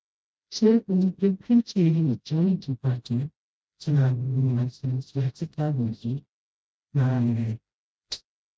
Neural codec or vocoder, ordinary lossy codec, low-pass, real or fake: codec, 16 kHz, 0.5 kbps, FreqCodec, smaller model; none; none; fake